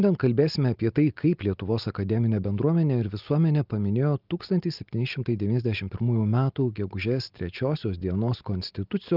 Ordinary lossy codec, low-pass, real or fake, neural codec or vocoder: Opus, 32 kbps; 5.4 kHz; real; none